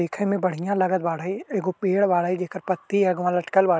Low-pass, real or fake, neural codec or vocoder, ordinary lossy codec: none; real; none; none